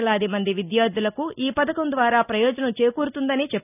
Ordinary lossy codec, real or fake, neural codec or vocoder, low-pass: none; real; none; 3.6 kHz